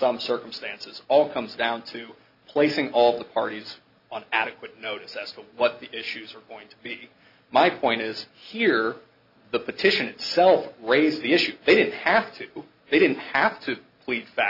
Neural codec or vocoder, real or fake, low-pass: none; real; 5.4 kHz